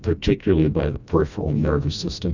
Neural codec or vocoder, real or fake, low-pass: codec, 16 kHz, 1 kbps, FreqCodec, smaller model; fake; 7.2 kHz